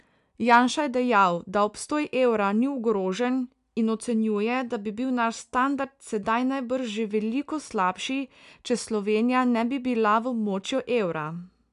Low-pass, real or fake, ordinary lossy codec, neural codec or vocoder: 10.8 kHz; real; none; none